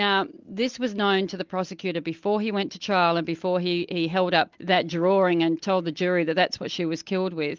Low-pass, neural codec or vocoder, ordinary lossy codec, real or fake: 7.2 kHz; none; Opus, 32 kbps; real